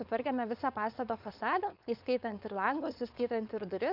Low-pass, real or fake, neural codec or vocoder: 5.4 kHz; fake; codec, 16 kHz, 4.8 kbps, FACodec